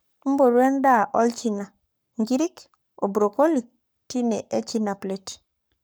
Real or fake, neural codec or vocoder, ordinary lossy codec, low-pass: fake; codec, 44.1 kHz, 7.8 kbps, Pupu-Codec; none; none